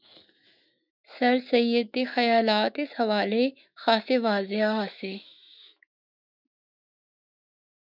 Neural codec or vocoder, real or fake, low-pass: autoencoder, 48 kHz, 128 numbers a frame, DAC-VAE, trained on Japanese speech; fake; 5.4 kHz